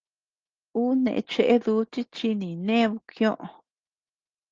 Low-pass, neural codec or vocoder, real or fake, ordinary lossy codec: 7.2 kHz; none; real; Opus, 16 kbps